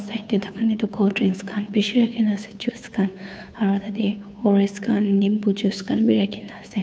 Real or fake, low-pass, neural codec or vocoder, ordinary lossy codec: fake; none; codec, 16 kHz, 2 kbps, FunCodec, trained on Chinese and English, 25 frames a second; none